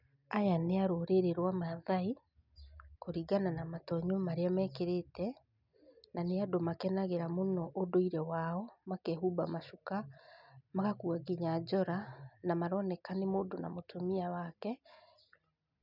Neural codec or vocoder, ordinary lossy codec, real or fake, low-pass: none; none; real; 5.4 kHz